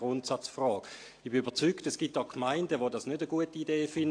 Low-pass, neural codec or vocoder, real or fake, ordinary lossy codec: 9.9 kHz; none; real; AAC, 48 kbps